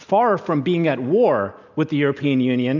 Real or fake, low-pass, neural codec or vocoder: real; 7.2 kHz; none